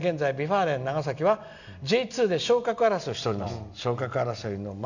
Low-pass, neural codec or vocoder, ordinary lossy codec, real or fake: 7.2 kHz; none; none; real